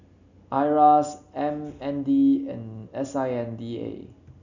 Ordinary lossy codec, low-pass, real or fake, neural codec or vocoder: none; 7.2 kHz; real; none